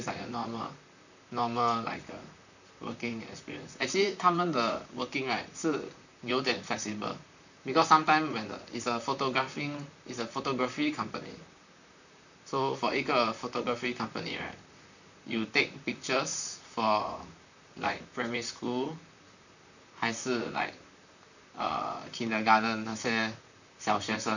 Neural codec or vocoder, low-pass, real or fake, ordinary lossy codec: vocoder, 44.1 kHz, 128 mel bands, Pupu-Vocoder; 7.2 kHz; fake; none